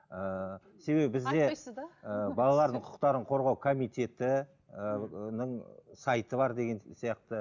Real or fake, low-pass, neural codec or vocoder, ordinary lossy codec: real; 7.2 kHz; none; none